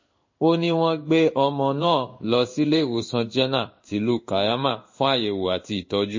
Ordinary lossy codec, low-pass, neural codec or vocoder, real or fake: MP3, 32 kbps; 7.2 kHz; codec, 16 kHz in and 24 kHz out, 1 kbps, XY-Tokenizer; fake